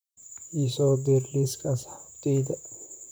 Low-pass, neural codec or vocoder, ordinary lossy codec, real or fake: none; vocoder, 44.1 kHz, 128 mel bands, Pupu-Vocoder; none; fake